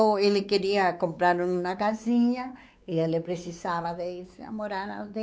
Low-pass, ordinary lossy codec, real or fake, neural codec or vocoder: none; none; fake; codec, 16 kHz, 4 kbps, X-Codec, WavLM features, trained on Multilingual LibriSpeech